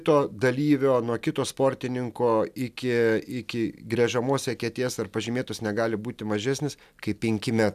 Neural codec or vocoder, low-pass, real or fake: none; 14.4 kHz; real